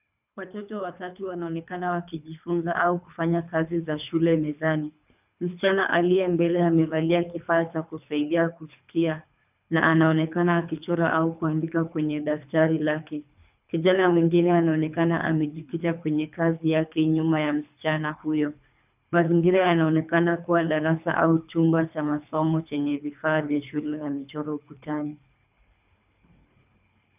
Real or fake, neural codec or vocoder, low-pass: fake; codec, 24 kHz, 3 kbps, HILCodec; 3.6 kHz